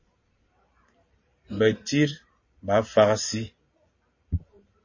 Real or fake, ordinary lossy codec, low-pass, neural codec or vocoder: fake; MP3, 32 kbps; 7.2 kHz; vocoder, 44.1 kHz, 128 mel bands every 512 samples, BigVGAN v2